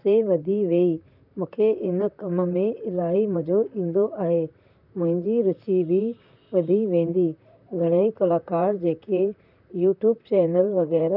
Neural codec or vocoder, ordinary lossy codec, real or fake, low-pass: vocoder, 44.1 kHz, 128 mel bands, Pupu-Vocoder; none; fake; 5.4 kHz